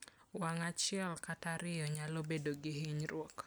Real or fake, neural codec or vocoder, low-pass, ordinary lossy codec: real; none; none; none